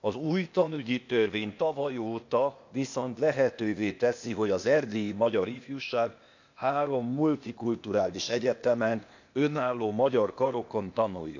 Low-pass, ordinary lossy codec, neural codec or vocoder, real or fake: 7.2 kHz; none; codec, 16 kHz, 0.8 kbps, ZipCodec; fake